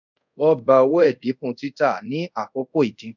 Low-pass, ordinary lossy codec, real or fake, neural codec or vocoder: 7.2 kHz; none; fake; codec, 24 kHz, 0.5 kbps, DualCodec